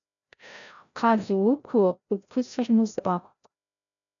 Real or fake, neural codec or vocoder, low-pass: fake; codec, 16 kHz, 0.5 kbps, FreqCodec, larger model; 7.2 kHz